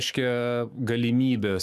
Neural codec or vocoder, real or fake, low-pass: autoencoder, 48 kHz, 128 numbers a frame, DAC-VAE, trained on Japanese speech; fake; 14.4 kHz